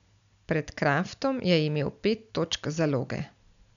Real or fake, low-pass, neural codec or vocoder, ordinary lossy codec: real; 7.2 kHz; none; none